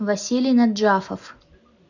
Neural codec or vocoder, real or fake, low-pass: none; real; 7.2 kHz